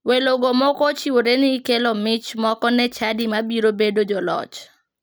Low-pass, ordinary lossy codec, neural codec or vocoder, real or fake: none; none; none; real